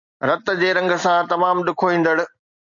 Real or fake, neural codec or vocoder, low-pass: real; none; 7.2 kHz